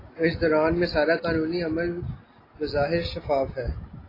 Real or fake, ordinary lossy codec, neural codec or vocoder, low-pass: real; AAC, 24 kbps; none; 5.4 kHz